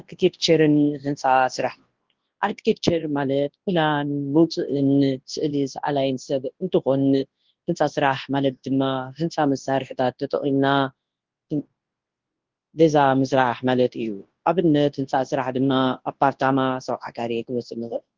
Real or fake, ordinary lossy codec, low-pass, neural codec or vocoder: fake; Opus, 16 kbps; 7.2 kHz; codec, 24 kHz, 0.9 kbps, WavTokenizer, large speech release